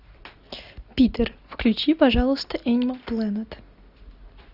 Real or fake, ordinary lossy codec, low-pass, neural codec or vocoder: real; Opus, 64 kbps; 5.4 kHz; none